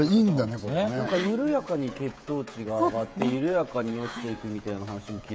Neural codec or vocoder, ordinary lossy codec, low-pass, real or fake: codec, 16 kHz, 16 kbps, FreqCodec, smaller model; none; none; fake